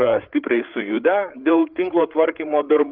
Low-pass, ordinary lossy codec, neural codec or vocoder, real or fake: 5.4 kHz; Opus, 32 kbps; codec, 16 kHz, 8 kbps, FreqCodec, larger model; fake